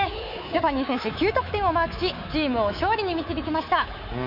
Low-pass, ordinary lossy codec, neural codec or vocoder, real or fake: 5.4 kHz; none; codec, 24 kHz, 3.1 kbps, DualCodec; fake